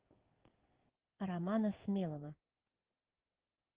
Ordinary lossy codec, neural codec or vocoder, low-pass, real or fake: Opus, 16 kbps; codec, 16 kHz in and 24 kHz out, 1 kbps, XY-Tokenizer; 3.6 kHz; fake